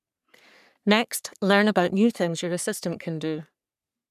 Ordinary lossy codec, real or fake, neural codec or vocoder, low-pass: none; fake; codec, 44.1 kHz, 3.4 kbps, Pupu-Codec; 14.4 kHz